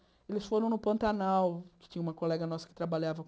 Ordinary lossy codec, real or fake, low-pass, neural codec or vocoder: none; real; none; none